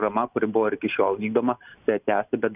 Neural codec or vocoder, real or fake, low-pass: none; real; 3.6 kHz